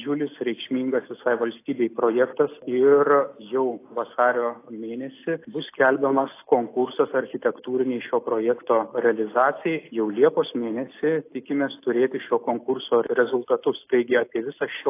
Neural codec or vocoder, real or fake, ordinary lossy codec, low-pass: none; real; AAC, 24 kbps; 3.6 kHz